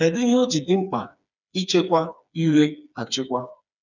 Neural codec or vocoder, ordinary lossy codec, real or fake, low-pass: codec, 16 kHz, 4 kbps, FreqCodec, smaller model; none; fake; 7.2 kHz